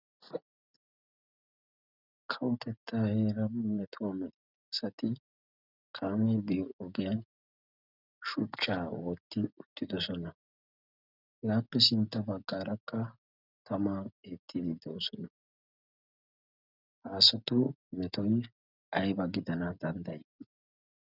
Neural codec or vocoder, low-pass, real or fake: none; 5.4 kHz; real